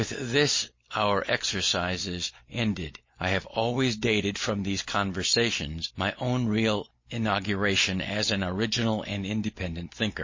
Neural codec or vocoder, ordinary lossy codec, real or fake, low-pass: none; MP3, 32 kbps; real; 7.2 kHz